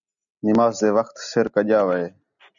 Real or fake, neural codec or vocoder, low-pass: real; none; 7.2 kHz